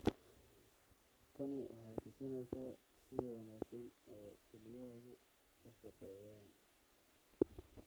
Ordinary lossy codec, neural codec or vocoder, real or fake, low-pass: none; codec, 44.1 kHz, 3.4 kbps, Pupu-Codec; fake; none